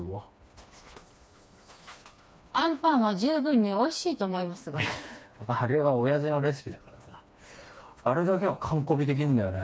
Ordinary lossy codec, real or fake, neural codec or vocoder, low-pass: none; fake; codec, 16 kHz, 2 kbps, FreqCodec, smaller model; none